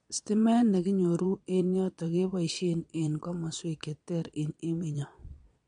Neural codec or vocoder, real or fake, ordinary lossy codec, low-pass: vocoder, 22.05 kHz, 80 mel bands, Vocos; fake; MP3, 64 kbps; 9.9 kHz